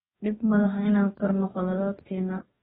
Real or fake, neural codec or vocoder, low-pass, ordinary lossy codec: fake; codec, 44.1 kHz, 2.6 kbps, DAC; 19.8 kHz; AAC, 16 kbps